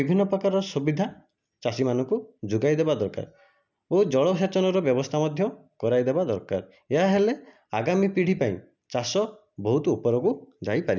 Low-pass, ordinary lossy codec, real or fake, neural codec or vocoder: 7.2 kHz; none; real; none